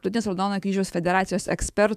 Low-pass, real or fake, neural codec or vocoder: 14.4 kHz; fake; autoencoder, 48 kHz, 128 numbers a frame, DAC-VAE, trained on Japanese speech